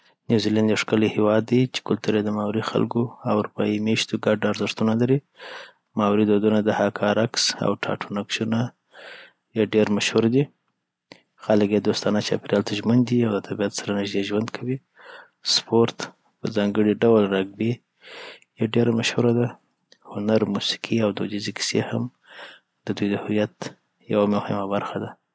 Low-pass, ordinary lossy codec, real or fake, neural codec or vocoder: none; none; real; none